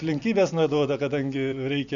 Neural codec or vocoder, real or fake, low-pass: none; real; 7.2 kHz